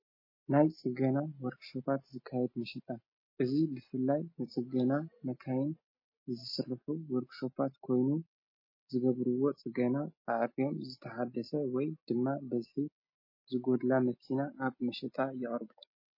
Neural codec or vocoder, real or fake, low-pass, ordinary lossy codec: none; real; 5.4 kHz; MP3, 24 kbps